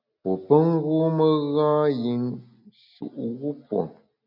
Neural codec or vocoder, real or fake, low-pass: none; real; 5.4 kHz